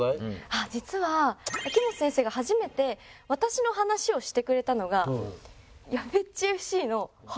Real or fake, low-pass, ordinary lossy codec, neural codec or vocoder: real; none; none; none